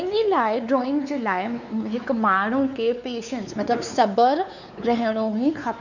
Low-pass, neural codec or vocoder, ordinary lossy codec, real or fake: 7.2 kHz; codec, 16 kHz, 4 kbps, X-Codec, WavLM features, trained on Multilingual LibriSpeech; none; fake